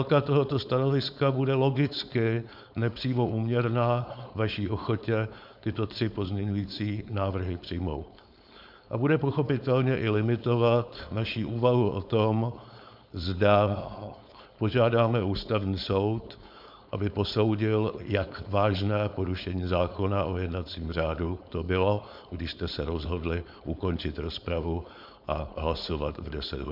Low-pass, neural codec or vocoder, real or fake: 5.4 kHz; codec, 16 kHz, 4.8 kbps, FACodec; fake